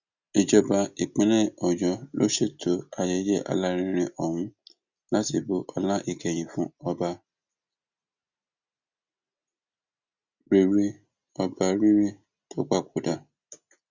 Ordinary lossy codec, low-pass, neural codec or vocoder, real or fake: Opus, 64 kbps; 7.2 kHz; none; real